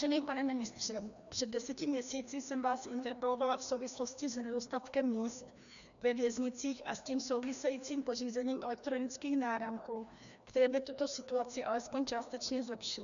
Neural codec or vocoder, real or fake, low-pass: codec, 16 kHz, 1 kbps, FreqCodec, larger model; fake; 7.2 kHz